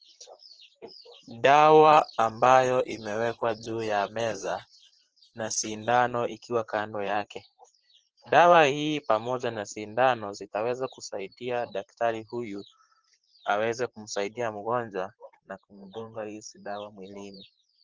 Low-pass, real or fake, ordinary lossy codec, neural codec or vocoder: 7.2 kHz; fake; Opus, 16 kbps; vocoder, 44.1 kHz, 128 mel bands, Pupu-Vocoder